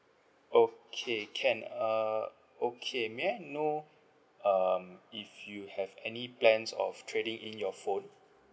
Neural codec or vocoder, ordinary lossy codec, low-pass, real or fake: none; none; none; real